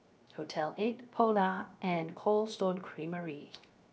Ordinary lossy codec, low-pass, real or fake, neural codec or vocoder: none; none; fake; codec, 16 kHz, 0.7 kbps, FocalCodec